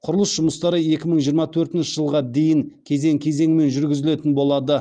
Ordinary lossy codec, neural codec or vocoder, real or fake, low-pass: Opus, 32 kbps; none; real; 9.9 kHz